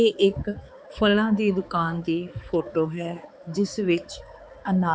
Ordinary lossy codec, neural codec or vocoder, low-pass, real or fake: none; codec, 16 kHz, 4 kbps, X-Codec, HuBERT features, trained on balanced general audio; none; fake